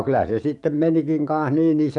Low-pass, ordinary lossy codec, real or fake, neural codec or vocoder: 10.8 kHz; none; fake; codec, 44.1 kHz, 7.8 kbps, DAC